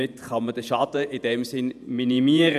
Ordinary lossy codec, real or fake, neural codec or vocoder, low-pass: none; fake; vocoder, 48 kHz, 128 mel bands, Vocos; 14.4 kHz